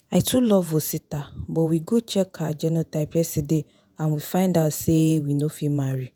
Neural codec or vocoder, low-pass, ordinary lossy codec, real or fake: vocoder, 48 kHz, 128 mel bands, Vocos; none; none; fake